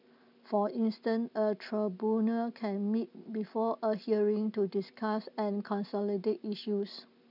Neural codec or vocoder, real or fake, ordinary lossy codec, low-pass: none; real; none; 5.4 kHz